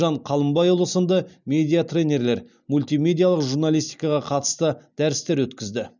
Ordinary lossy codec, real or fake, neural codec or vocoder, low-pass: none; real; none; 7.2 kHz